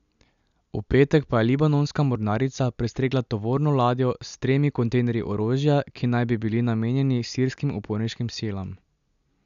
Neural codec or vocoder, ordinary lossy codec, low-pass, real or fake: none; none; 7.2 kHz; real